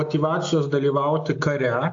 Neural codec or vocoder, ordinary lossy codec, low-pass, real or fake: none; AAC, 48 kbps; 7.2 kHz; real